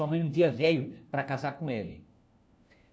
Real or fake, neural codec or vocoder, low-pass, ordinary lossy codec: fake; codec, 16 kHz, 1 kbps, FunCodec, trained on LibriTTS, 50 frames a second; none; none